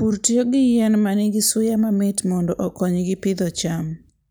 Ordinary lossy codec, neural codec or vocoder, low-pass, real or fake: none; none; none; real